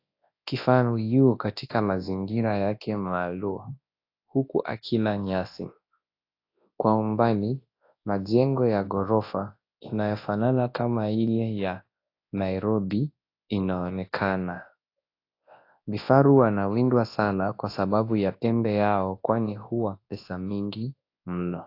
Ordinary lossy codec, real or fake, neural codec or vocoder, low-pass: AAC, 32 kbps; fake; codec, 24 kHz, 0.9 kbps, WavTokenizer, large speech release; 5.4 kHz